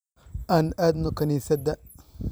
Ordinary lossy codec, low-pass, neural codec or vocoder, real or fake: none; none; vocoder, 44.1 kHz, 128 mel bands every 256 samples, BigVGAN v2; fake